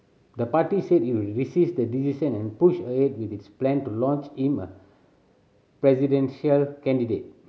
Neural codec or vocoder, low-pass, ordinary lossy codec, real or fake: none; none; none; real